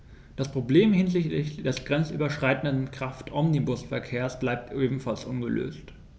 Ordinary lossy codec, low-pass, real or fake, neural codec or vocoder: none; none; real; none